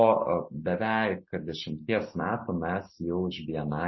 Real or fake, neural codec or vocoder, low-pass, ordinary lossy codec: real; none; 7.2 kHz; MP3, 24 kbps